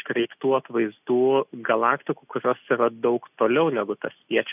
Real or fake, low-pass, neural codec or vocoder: real; 3.6 kHz; none